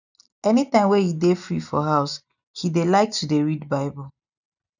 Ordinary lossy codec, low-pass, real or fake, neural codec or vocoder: none; 7.2 kHz; real; none